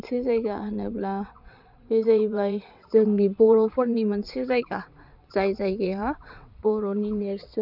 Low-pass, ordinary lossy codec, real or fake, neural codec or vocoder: 5.4 kHz; none; fake; vocoder, 22.05 kHz, 80 mel bands, WaveNeXt